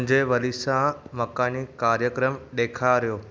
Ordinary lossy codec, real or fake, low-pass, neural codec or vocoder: none; real; none; none